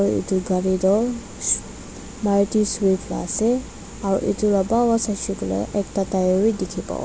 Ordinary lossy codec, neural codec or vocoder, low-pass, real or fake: none; none; none; real